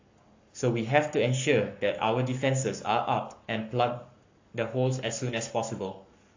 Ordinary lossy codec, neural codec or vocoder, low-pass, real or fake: AAC, 48 kbps; codec, 44.1 kHz, 7.8 kbps, Pupu-Codec; 7.2 kHz; fake